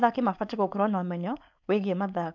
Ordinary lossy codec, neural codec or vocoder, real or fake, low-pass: none; codec, 16 kHz, 4.8 kbps, FACodec; fake; 7.2 kHz